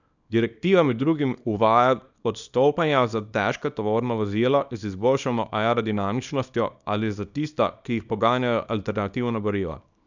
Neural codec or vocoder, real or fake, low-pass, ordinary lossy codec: codec, 24 kHz, 0.9 kbps, WavTokenizer, small release; fake; 7.2 kHz; none